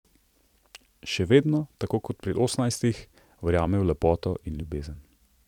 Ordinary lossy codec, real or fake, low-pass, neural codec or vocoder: none; fake; 19.8 kHz; vocoder, 44.1 kHz, 128 mel bands every 256 samples, BigVGAN v2